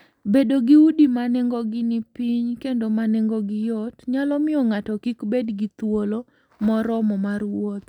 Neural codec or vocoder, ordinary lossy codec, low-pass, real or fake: none; none; 19.8 kHz; real